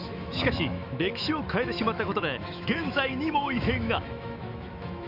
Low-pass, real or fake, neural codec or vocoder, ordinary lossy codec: 5.4 kHz; fake; autoencoder, 48 kHz, 128 numbers a frame, DAC-VAE, trained on Japanese speech; none